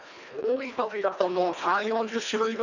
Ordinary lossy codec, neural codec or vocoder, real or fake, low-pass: none; codec, 24 kHz, 1.5 kbps, HILCodec; fake; 7.2 kHz